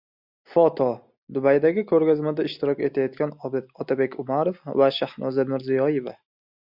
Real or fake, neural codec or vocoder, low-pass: real; none; 5.4 kHz